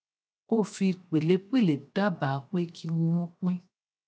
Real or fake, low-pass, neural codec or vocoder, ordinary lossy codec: fake; none; codec, 16 kHz, 0.7 kbps, FocalCodec; none